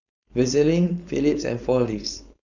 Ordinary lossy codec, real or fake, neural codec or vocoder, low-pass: none; fake; codec, 16 kHz, 4.8 kbps, FACodec; 7.2 kHz